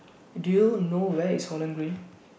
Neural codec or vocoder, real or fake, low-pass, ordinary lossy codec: none; real; none; none